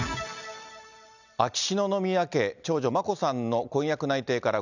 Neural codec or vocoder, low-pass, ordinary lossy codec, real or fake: none; 7.2 kHz; none; real